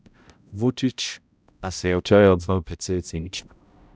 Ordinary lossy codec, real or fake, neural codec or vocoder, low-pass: none; fake; codec, 16 kHz, 0.5 kbps, X-Codec, HuBERT features, trained on balanced general audio; none